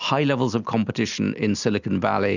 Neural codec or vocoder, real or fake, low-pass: none; real; 7.2 kHz